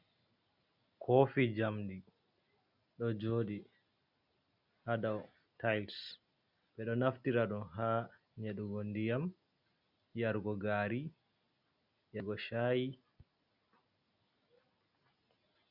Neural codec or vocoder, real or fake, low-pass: none; real; 5.4 kHz